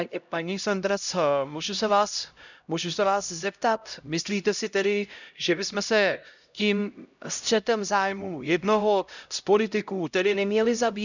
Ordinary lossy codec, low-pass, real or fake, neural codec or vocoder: none; 7.2 kHz; fake; codec, 16 kHz, 0.5 kbps, X-Codec, HuBERT features, trained on LibriSpeech